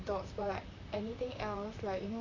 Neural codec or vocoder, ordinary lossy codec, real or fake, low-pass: vocoder, 44.1 kHz, 128 mel bands, Pupu-Vocoder; AAC, 32 kbps; fake; 7.2 kHz